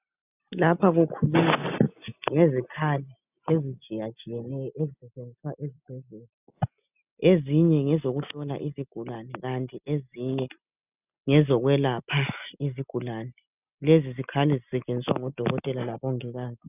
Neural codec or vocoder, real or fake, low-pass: none; real; 3.6 kHz